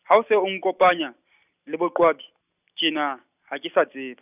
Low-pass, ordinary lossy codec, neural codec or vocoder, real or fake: 3.6 kHz; none; none; real